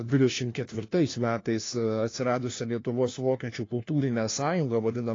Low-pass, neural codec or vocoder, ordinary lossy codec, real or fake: 7.2 kHz; codec, 16 kHz, 1 kbps, FunCodec, trained on LibriTTS, 50 frames a second; AAC, 32 kbps; fake